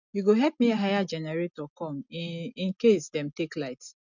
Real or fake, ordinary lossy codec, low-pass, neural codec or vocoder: real; none; 7.2 kHz; none